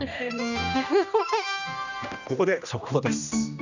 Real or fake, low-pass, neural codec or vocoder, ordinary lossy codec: fake; 7.2 kHz; codec, 16 kHz, 1 kbps, X-Codec, HuBERT features, trained on general audio; none